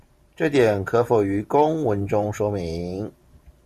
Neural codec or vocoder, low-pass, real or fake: vocoder, 44.1 kHz, 128 mel bands every 512 samples, BigVGAN v2; 14.4 kHz; fake